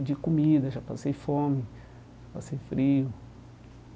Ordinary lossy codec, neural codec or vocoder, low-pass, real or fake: none; none; none; real